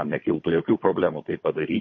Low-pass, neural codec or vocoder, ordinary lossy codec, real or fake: 7.2 kHz; codec, 16 kHz, 16 kbps, FunCodec, trained on Chinese and English, 50 frames a second; MP3, 32 kbps; fake